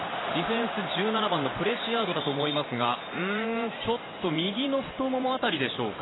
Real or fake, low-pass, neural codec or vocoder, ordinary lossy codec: fake; 7.2 kHz; vocoder, 44.1 kHz, 128 mel bands every 512 samples, BigVGAN v2; AAC, 16 kbps